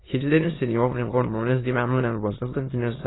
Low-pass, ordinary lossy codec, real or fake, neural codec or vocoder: 7.2 kHz; AAC, 16 kbps; fake; autoencoder, 22.05 kHz, a latent of 192 numbers a frame, VITS, trained on many speakers